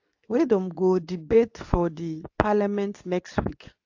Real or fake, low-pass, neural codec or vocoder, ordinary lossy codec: fake; 7.2 kHz; codec, 24 kHz, 0.9 kbps, WavTokenizer, medium speech release version 2; AAC, 48 kbps